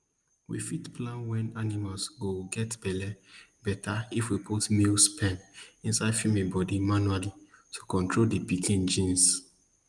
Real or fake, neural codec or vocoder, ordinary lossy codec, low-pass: real; none; Opus, 32 kbps; 10.8 kHz